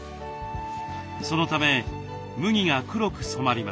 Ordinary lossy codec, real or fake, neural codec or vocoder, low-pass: none; real; none; none